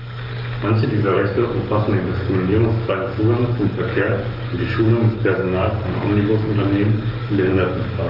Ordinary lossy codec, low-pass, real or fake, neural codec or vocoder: Opus, 32 kbps; 5.4 kHz; fake; codec, 44.1 kHz, 7.8 kbps, DAC